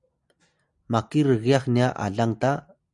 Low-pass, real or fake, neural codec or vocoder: 10.8 kHz; real; none